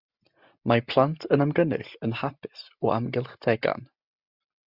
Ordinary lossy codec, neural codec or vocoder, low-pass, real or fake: Opus, 64 kbps; none; 5.4 kHz; real